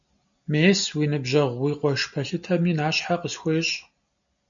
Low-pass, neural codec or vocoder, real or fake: 7.2 kHz; none; real